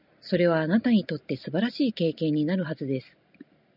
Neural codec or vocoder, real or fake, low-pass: none; real; 5.4 kHz